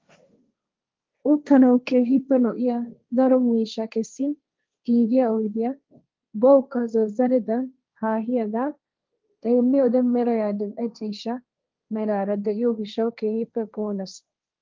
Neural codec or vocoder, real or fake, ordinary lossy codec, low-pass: codec, 16 kHz, 1.1 kbps, Voila-Tokenizer; fake; Opus, 24 kbps; 7.2 kHz